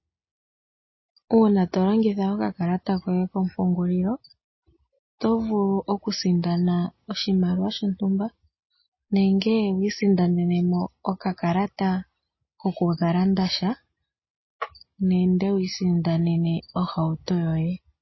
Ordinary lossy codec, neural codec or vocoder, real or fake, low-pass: MP3, 24 kbps; none; real; 7.2 kHz